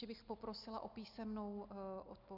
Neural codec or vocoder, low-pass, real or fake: none; 5.4 kHz; real